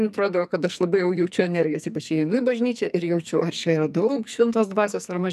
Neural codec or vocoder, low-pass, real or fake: codec, 44.1 kHz, 2.6 kbps, SNAC; 14.4 kHz; fake